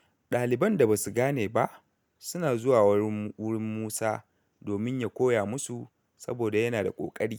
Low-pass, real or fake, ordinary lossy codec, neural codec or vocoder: none; real; none; none